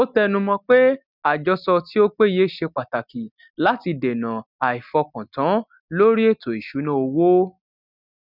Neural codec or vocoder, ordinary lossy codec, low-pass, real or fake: none; none; 5.4 kHz; real